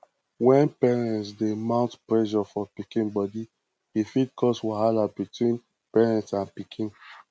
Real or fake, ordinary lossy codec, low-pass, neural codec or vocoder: real; none; none; none